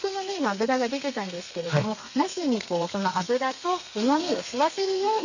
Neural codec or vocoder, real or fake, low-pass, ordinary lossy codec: codec, 32 kHz, 1.9 kbps, SNAC; fake; 7.2 kHz; none